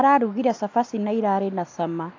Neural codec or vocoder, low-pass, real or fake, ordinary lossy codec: none; 7.2 kHz; real; AAC, 48 kbps